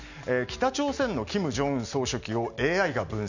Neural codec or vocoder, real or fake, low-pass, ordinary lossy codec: none; real; 7.2 kHz; none